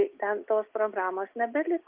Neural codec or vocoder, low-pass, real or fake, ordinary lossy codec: none; 3.6 kHz; real; Opus, 24 kbps